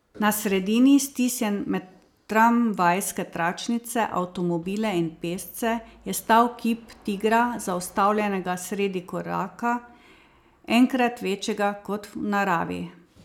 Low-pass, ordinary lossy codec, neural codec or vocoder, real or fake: 19.8 kHz; none; none; real